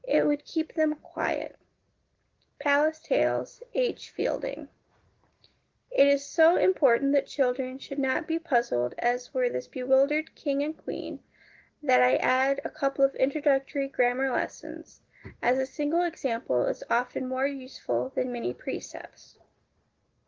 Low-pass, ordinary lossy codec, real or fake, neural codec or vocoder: 7.2 kHz; Opus, 16 kbps; real; none